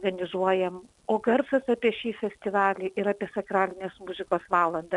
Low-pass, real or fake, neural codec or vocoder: 10.8 kHz; real; none